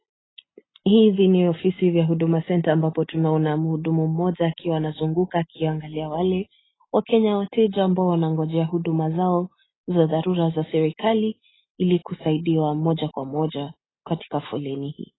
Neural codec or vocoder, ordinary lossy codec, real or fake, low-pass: none; AAC, 16 kbps; real; 7.2 kHz